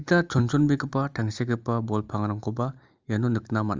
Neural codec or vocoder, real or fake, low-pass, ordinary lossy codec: none; real; 7.2 kHz; Opus, 32 kbps